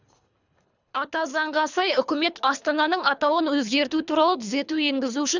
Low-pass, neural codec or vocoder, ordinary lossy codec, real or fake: 7.2 kHz; codec, 24 kHz, 3 kbps, HILCodec; none; fake